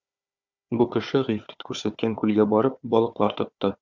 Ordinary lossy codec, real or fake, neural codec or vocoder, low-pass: Opus, 64 kbps; fake; codec, 16 kHz, 4 kbps, FunCodec, trained on Chinese and English, 50 frames a second; 7.2 kHz